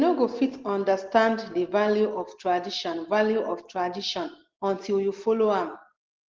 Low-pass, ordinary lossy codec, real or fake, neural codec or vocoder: 7.2 kHz; Opus, 16 kbps; real; none